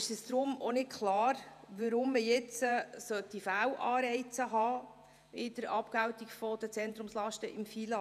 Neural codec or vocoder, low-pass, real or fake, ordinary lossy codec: vocoder, 48 kHz, 128 mel bands, Vocos; 14.4 kHz; fake; none